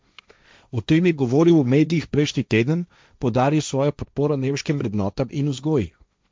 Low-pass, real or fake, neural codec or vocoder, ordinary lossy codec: none; fake; codec, 16 kHz, 1.1 kbps, Voila-Tokenizer; none